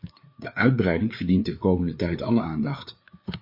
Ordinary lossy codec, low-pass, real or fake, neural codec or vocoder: MP3, 32 kbps; 5.4 kHz; fake; codec, 16 kHz, 4 kbps, FreqCodec, larger model